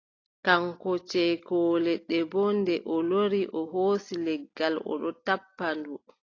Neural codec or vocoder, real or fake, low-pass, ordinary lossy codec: none; real; 7.2 kHz; AAC, 32 kbps